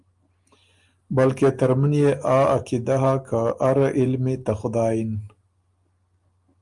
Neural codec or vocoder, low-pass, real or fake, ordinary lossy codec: none; 10.8 kHz; real; Opus, 32 kbps